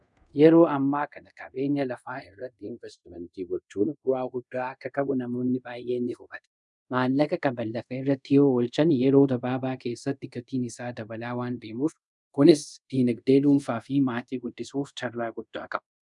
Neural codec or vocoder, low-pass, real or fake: codec, 24 kHz, 0.5 kbps, DualCodec; 10.8 kHz; fake